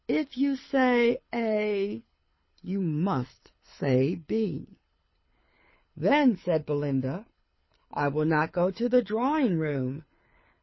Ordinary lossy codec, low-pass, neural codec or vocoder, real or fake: MP3, 24 kbps; 7.2 kHz; codec, 24 kHz, 6 kbps, HILCodec; fake